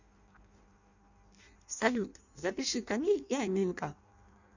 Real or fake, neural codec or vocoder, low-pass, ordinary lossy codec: fake; codec, 16 kHz in and 24 kHz out, 0.6 kbps, FireRedTTS-2 codec; 7.2 kHz; none